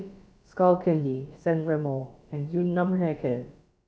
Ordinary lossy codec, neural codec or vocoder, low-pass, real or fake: none; codec, 16 kHz, about 1 kbps, DyCAST, with the encoder's durations; none; fake